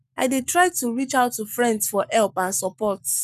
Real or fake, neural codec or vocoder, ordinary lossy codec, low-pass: fake; codec, 44.1 kHz, 7.8 kbps, Pupu-Codec; none; 14.4 kHz